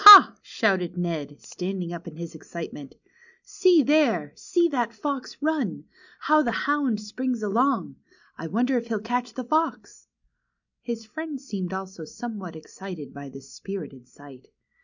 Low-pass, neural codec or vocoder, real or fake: 7.2 kHz; none; real